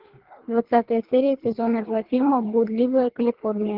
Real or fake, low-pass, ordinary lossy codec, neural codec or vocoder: fake; 5.4 kHz; Opus, 16 kbps; codec, 24 kHz, 3 kbps, HILCodec